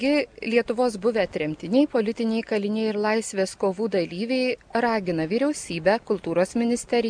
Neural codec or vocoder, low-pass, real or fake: none; 9.9 kHz; real